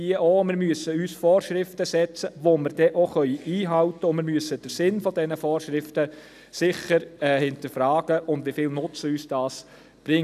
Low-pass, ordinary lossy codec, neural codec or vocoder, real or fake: 14.4 kHz; none; none; real